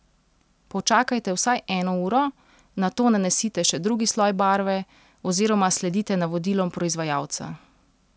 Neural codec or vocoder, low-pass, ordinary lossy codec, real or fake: none; none; none; real